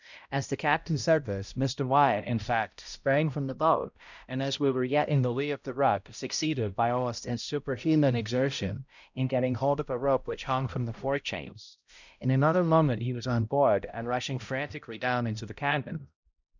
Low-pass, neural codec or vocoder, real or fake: 7.2 kHz; codec, 16 kHz, 0.5 kbps, X-Codec, HuBERT features, trained on balanced general audio; fake